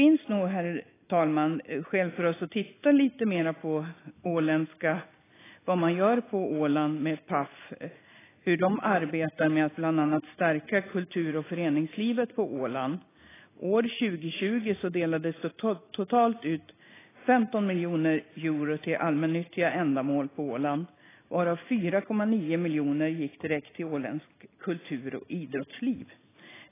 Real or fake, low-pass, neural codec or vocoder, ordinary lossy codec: real; 3.6 kHz; none; AAC, 16 kbps